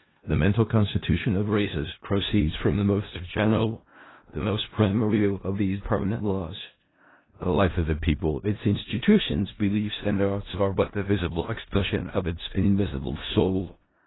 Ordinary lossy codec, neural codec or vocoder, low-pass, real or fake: AAC, 16 kbps; codec, 16 kHz in and 24 kHz out, 0.4 kbps, LongCat-Audio-Codec, four codebook decoder; 7.2 kHz; fake